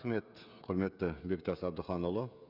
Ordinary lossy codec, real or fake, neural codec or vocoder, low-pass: none; fake; codec, 16 kHz, 16 kbps, FreqCodec, smaller model; 5.4 kHz